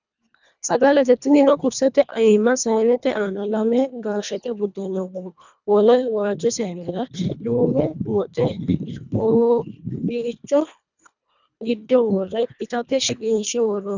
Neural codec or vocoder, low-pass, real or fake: codec, 24 kHz, 1.5 kbps, HILCodec; 7.2 kHz; fake